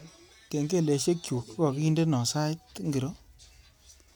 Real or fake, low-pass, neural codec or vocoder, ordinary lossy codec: real; none; none; none